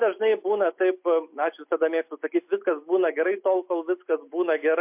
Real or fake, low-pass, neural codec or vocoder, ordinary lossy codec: real; 3.6 kHz; none; MP3, 32 kbps